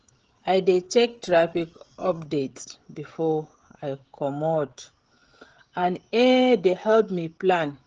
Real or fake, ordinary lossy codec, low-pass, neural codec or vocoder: fake; Opus, 16 kbps; 7.2 kHz; codec, 16 kHz, 16 kbps, FreqCodec, smaller model